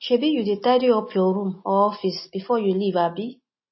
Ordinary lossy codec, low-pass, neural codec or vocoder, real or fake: MP3, 24 kbps; 7.2 kHz; none; real